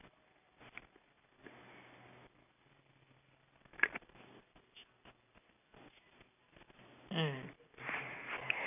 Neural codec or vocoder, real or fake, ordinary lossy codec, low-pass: none; real; none; 3.6 kHz